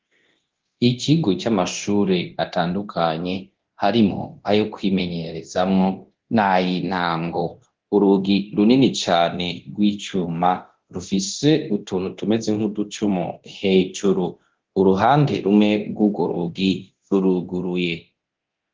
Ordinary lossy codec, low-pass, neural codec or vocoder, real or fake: Opus, 16 kbps; 7.2 kHz; codec, 24 kHz, 0.9 kbps, DualCodec; fake